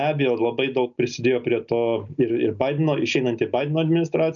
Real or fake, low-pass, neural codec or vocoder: real; 7.2 kHz; none